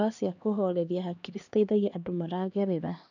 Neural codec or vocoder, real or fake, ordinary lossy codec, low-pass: codec, 16 kHz, 2 kbps, X-Codec, HuBERT features, trained on LibriSpeech; fake; none; 7.2 kHz